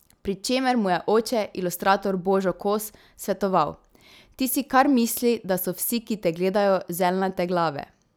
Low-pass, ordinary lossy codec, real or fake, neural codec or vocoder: none; none; real; none